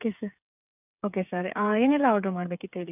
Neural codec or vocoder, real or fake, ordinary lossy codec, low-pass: codec, 16 kHz, 8 kbps, FreqCodec, smaller model; fake; none; 3.6 kHz